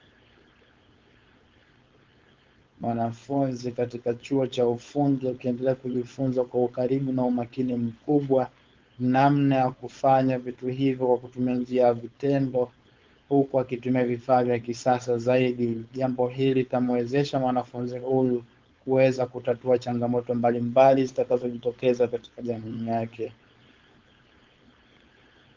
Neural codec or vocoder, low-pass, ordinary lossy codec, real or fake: codec, 16 kHz, 4.8 kbps, FACodec; 7.2 kHz; Opus, 24 kbps; fake